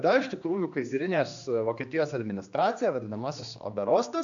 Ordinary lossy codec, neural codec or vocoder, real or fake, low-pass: AAC, 64 kbps; codec, 16 kHz, 2 kbps, X-Codec, HuBERT features, trained on general audio; fake; 7.2 kHz